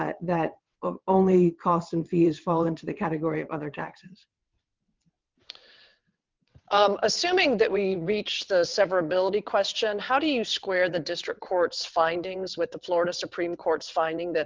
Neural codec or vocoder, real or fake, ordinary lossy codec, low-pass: vocoder, 22.05 kHz, 80 mel bands, WaveNeXt; fake; Opus, 24 kbps; 7.2 kHz